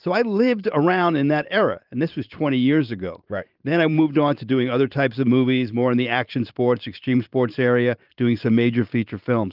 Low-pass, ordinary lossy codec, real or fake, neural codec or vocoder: 5.4 kHz; Opus, 32 kbps; fake; vocoder, 44.1 kHz, 128 mel bands every 512 samples, BigVGAN v2